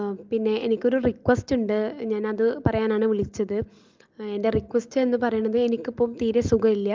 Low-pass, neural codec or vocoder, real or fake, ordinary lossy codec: 7.2 kHz; none; real; Opus, 32 kbps